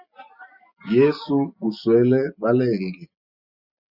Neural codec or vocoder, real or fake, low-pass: none; real; 5.4 kHz